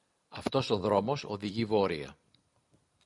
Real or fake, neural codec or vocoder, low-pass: real; none; 10.8 kHz